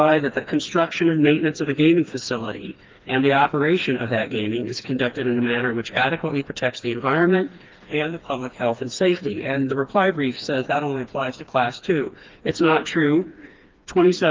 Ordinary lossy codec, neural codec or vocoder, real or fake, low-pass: Opus, 32 kbps; codec, 16 kHz, 2 kbps, FreqCodec, smaller model; fake; 7.2 kHz